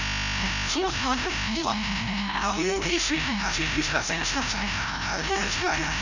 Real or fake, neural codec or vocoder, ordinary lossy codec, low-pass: fake; codec, 16 kHz, 0.5 kbps, FreqCodec, larger model; none; 7.2 kHz